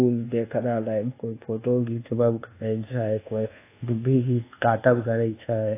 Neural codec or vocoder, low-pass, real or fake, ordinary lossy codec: codec, 24 kHz, 1.2 kbps, DualCodec; 3.6 kHz; fake; AAC, 24 kbps